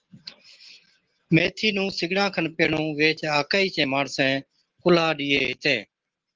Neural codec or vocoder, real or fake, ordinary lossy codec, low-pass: none; real; Opus, 16 kbps; 7.2 kHz